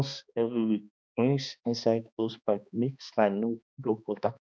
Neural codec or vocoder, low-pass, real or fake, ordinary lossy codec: codec, 16 kHz, 2 kbps, X-Codec, HuBERT features, trained on balanced general audio; none; fake; none